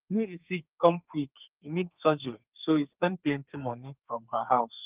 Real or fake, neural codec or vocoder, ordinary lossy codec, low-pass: fake; codec, 32 kHz, 1.9 kbps, SNAC; Opus, 24 kbps; 3.6 kHz